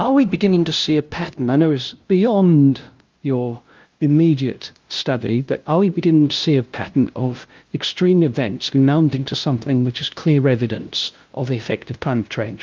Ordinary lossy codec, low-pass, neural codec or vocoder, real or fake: Opus, 32 kbps; 7.2 kHz; codec, 16 kHz, 0.5 kbps, FunCodec, trained on LibriTTS, 25 frames a second; fake